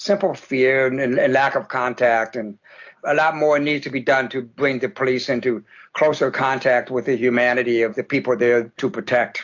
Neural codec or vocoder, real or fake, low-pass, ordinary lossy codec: none; real; 7.2 kHz; AAC, 48 kbps